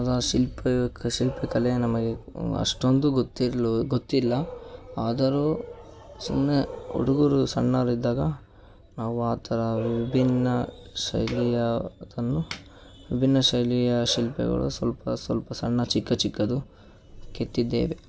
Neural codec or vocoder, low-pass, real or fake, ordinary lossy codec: none; none; real; none